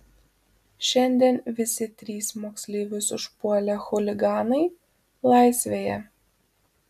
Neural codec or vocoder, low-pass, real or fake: none; 14.4 kHz; real